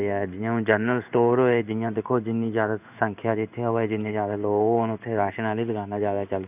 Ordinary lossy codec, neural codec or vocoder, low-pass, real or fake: none; codec, 16 kHz in and 24 kHz out, 1 kbps, XY-Tokenizer; 3.6 kHz; fake